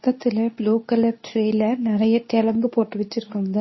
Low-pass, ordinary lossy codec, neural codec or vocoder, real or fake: 7.2 kHz; MP3, 24 kbps; codec, 24 kHz, 0.9 kbps, WavTokenizer, medium speech release version 2; fake